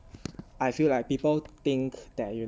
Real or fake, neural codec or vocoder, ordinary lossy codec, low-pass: real; none; none; none